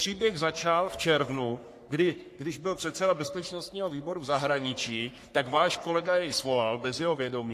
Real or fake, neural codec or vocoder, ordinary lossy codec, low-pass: fake; codec, 44.1 kHz, 3.4 kbps, Pupu-Codec; AAC, 64 kbps; 14.4 kHz